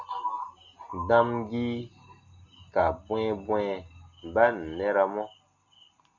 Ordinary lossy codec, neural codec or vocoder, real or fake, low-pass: MP3, 64 kbps; none; real; 7.2 kHz